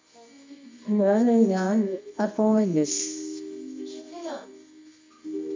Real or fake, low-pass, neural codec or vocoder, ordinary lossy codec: fake; 7.2 kHz; codec, 24 kHz, 0.9 kbps, WavTokenizer, medium music audio release; MP3, 64 kbps